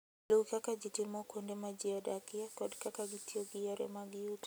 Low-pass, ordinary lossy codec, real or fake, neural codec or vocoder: none; none; real; none